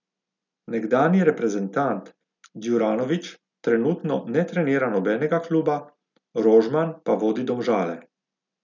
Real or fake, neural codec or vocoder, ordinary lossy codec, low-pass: real; none; none; 7.2 kHz